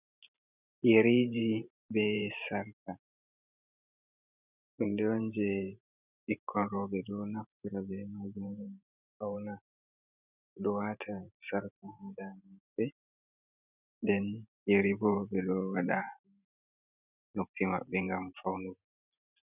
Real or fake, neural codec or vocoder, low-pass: fake; vocoder, 44.1 kHz, 128 mel bands every 512 samples, BigVGAN v2; 3.6 kHz